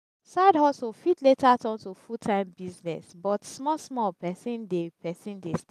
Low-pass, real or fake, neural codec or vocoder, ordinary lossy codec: 14.4 kHz; real; none; none